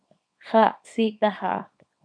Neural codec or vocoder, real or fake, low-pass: codec, 24 kHz, 0.9 kbps, WavTokenizer, small release; fake; 9.9 kHz